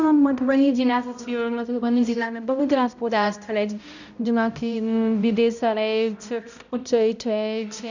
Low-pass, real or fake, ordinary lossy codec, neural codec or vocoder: 7.2 kHz; fake; none; codec, 16 kHz, 0.5 kbps, X-Codec, HuBERT features, trained on balanced general audio